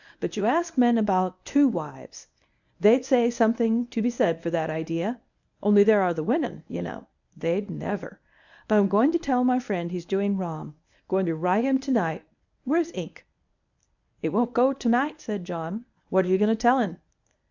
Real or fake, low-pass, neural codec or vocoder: fake; 7.2 kHz; codec, 24 kHz, 0.9 kbps, WavTokenizer, medium speech release version 1